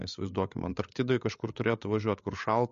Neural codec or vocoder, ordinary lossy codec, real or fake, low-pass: codec, 16 kHz, 8 kbps, FreqCodec, larger model; MP3, 48 kbps; fake; 7.2 kHz